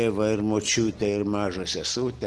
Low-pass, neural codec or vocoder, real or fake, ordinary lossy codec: 10.8 kHz; none; real; Opus, 16 kbps